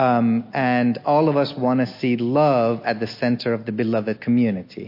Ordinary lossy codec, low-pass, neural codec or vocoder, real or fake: MP3, 32 kbps; 5.4 kHz; none; real